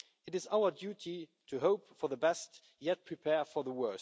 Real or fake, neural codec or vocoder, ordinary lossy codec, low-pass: real; none; none; none